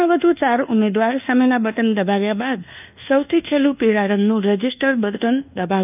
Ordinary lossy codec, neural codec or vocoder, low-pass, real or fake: none; codec, 24 kHz, 1.2 kbps, DualCodec; 3.6 kHz; fake